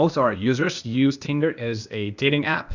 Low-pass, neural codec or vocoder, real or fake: 7.2 kHz; codec, 16 kHz, 0.8 kbps, ZipCodec; fake